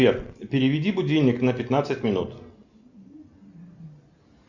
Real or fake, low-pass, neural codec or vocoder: real; 7.2 kHz; none